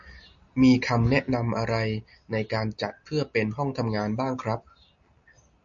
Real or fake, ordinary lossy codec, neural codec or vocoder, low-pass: real; MP3, 48 kbps; none; 7.2 kHz